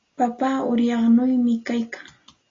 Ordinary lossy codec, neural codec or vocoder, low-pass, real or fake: AAC, 32 kbps; none; 7.2 kHz; real